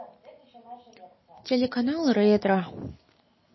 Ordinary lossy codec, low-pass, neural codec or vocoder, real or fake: MP3, 24 kbps; 7.2 kHz; none; real